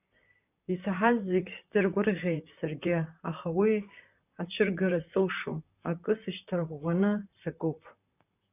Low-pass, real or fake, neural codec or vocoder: 3.6 kHz; fake; vocoder, 44.1 kHz, 128 mel bands, Pupu-Vocoder